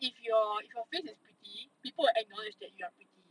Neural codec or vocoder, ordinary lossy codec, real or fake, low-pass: none; none; real; 9.9 kHz